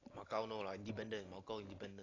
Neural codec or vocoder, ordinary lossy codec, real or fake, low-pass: none; AAC, 32 kbps; real; 7.2 kHz